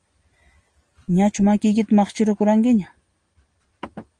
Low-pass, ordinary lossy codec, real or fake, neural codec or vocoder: 9.9 kHz; Opus, 24 kbps; real; none